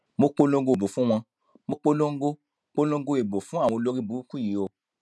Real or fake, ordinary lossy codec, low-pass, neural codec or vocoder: real; none; none; none